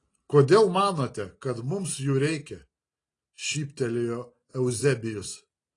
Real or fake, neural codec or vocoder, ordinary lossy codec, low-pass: real; none; AAC, 32 kbps; 10.8 kHz